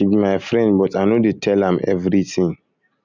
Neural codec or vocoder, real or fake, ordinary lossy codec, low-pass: none; real; none; 7.2 kHz